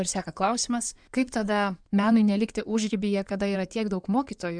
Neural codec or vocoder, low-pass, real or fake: codec, 16 kHz in and 24 kHz out, 2.2 kbps, FireRedTTS-2 codec; 9.9 kHz; fake